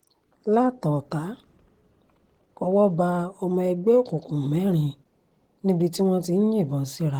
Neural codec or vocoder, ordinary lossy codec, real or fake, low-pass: none; Opus, 16 kbps; real; 19.8 kHz